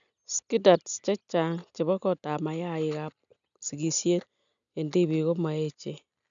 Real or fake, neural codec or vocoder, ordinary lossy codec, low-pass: real; none; none; 7.2 kHz